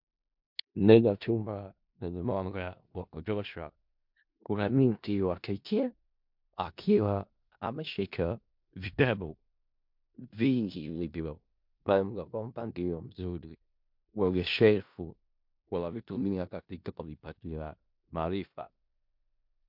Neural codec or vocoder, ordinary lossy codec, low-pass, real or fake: codec, 16 kHz in and 24 kHz out, 0.4 kbps, LongCat-Audio-Codec, four codebook decoder; MP3, 48 kbps; 5.4 kHz; fake